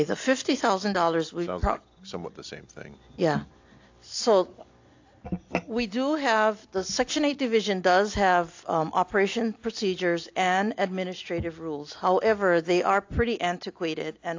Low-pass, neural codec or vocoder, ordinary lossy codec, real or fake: 7.2 kHz; none; AAC, 48 kbps; real